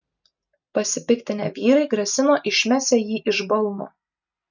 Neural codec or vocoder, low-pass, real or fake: none; 7.2 kHz; real